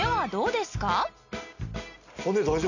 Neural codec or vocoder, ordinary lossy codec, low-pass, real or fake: none; none; 7.2 kHz; real